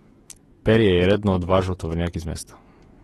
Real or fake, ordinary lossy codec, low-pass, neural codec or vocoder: fake; AAC, 32 kbps; 19.8 kHz; autoencoder, 48 kHz, 128 numbers a frame, DAC-VAE, trained on Japanese speech